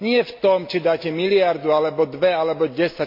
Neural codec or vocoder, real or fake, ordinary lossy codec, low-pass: none; real; MP3, 32 kbps; 5.4 kHz